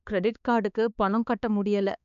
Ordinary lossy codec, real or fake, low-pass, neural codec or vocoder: none; fake; 7.2 kHz; codec, 16 kHz, 4 kbps, X-Codec, HuBERT features, trained on balanced general audio